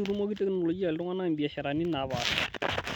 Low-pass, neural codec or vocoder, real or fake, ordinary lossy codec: none; none; real; none